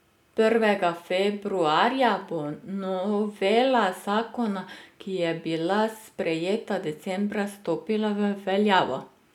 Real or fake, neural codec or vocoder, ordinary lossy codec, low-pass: real; none; none; 19.8 kHz